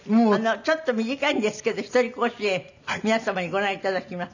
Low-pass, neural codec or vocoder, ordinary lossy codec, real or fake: 7.2 kHz; none; none; real